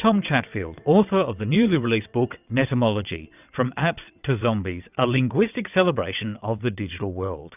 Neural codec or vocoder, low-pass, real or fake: vocoder, 22.05 kHz, 80 mel bands, WaveNeXt; 3.6 kHz; fake